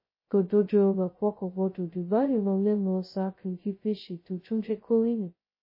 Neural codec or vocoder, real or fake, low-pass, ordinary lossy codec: codec, 16 kHz, 0.2 kbps, FocalCodec; fake; 5.4 kHz; MP3, 24 kbps